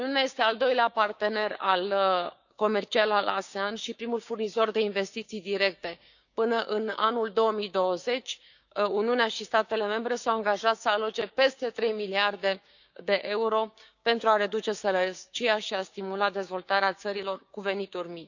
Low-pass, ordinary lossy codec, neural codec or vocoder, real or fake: 7.2 kHz; none; codec, 16 kHz, 6 kbps, DAC; fake